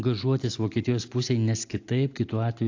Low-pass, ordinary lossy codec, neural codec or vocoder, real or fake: 7.2 kHz; AAC, 48 kbps; none; real